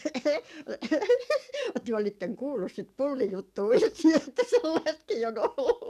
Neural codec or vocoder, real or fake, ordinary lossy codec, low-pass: codec, 44.1 kHz, 7.8 kbps, DAC; fake; none; 14.4 kHz